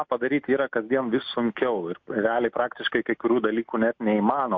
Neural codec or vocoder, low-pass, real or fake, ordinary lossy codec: none; 7.2 kHz; real; MP3, 48 kbps